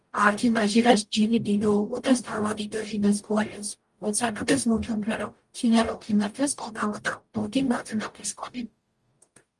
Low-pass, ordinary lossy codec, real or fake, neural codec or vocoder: 10.8 kHz; Opus, 32 kbps; fake; codec, 44.1 kHz, 0.9 kbps, DAC